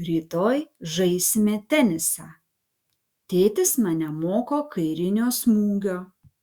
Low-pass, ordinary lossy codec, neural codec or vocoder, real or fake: 19.8 kHz; Opus, 64 kbps; autoencoder, 48 kHz, 128 numbers a frame, DAC-VAE, trained on Japanese speech; fake